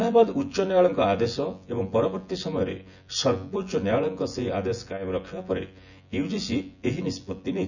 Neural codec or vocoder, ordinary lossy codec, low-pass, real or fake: vocoder, 24 kHz, 100 mel bands, Vocos; none; 7.2 kHz; fake